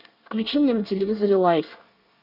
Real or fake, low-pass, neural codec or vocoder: fake; 5.4 kHz; codec, 24 kHz, 1 kbps, SNAC